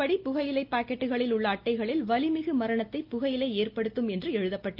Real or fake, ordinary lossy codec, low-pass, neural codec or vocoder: real; Opus, 32 kbps; 5.4 kHz; none